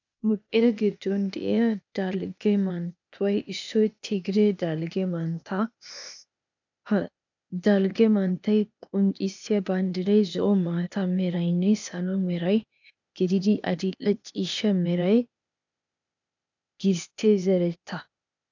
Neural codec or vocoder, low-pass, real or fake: codec, 16 kHz, 0.8 kbps, ZipCodec; 7.2 kHz; fake